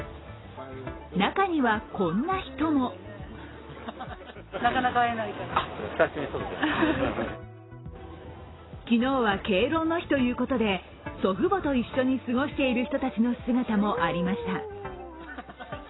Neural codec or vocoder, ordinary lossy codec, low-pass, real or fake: none; AAC, 16 kbps; 7.2 kHz; real